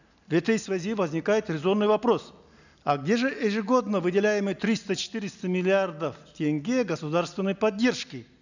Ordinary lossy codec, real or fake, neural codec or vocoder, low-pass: none; real; none; 7.2 kHz